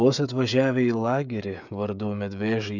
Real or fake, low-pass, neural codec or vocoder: fake; 7.2 kHz; codec, 16 kHz, 16 kbps, FreqCodec, smaller model